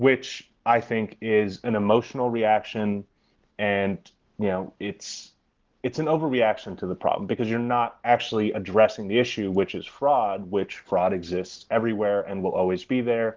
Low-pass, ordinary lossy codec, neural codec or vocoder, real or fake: 7.2 kHz; Opus, 16 kbps; none; real